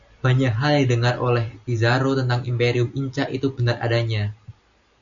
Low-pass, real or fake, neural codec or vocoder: 7.2 kHz; real; none